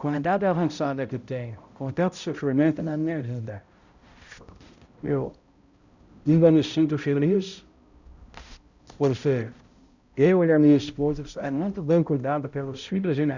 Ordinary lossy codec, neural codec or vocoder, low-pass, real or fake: Opus, 64 kbps; codec, 16 kHz, 0.5 kbps, X-Codec, HuBERT features, trained on balanced general audio; 7.2 kHz; fake